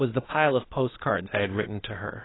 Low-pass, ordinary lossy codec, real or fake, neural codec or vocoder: 7.2 kHz; AAC, 16 kbps; fake; codec, 16 kHz, 0.8 kbps, ZipCodec